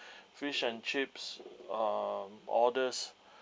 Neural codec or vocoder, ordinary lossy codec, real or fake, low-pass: none; none; real; none